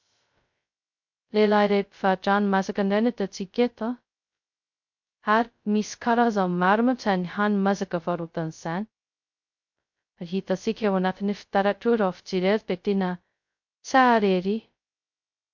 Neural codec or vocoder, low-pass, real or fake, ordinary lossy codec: codec, 16 kHz, 0.2 kbps, FocalCodec; 7.2 kHz; fake; MP3, 48 kbps